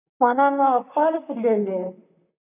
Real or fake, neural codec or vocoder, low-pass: fake; vocoder, 44.1 kHz, 128 mel bands, Pupu-Vocoder; 3.6 kHz